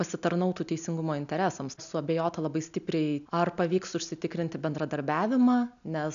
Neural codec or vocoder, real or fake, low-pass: none; real; 7.2 kHz